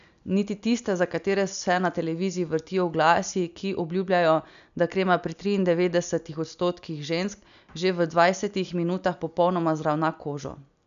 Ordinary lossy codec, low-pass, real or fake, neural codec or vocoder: none; 7.2 kHz; real; none